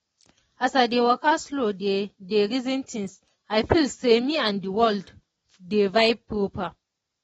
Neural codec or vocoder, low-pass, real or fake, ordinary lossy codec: none; 19.8 kHz; real; AAC, 24 kbps